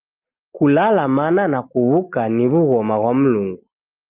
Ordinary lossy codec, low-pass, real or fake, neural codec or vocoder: Opus, 32 kbps; 3.6 kHz; real; none